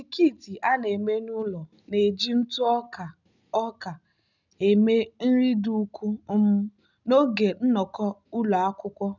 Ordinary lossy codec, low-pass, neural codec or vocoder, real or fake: none; 7.2 kHz; none; real